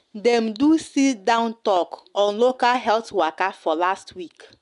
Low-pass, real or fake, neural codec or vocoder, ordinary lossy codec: 10.8 kHz; real; none; none